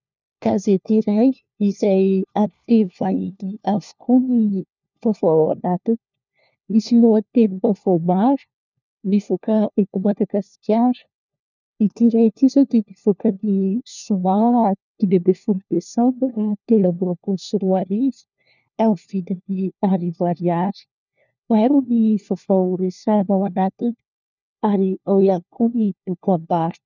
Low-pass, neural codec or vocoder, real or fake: 7.2 kHz; codec, 16 kHz, 1 kbps, FunCodec, trained on LibriTTS, 50 frames a second; fake